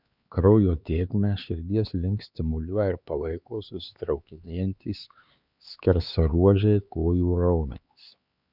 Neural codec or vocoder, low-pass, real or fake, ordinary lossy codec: codec, 16 kHz, 4 kbps, X-Codec, HuBERT features, trained on LibriSpeech; 5.4 kHz; fake; Opus, 64 kbps